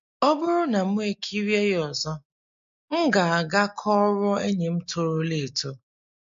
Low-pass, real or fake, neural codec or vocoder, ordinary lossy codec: 7.2 kHz; real; none; MP3, 48 kbps